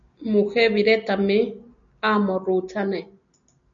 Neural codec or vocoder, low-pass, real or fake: none; 7.2 kHz; real